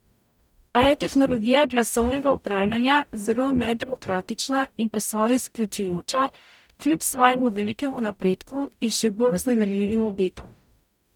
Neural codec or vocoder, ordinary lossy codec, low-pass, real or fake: codec, 44.1 kHz, 0.9 kbps, DAC; none; 19.8 kHz; fake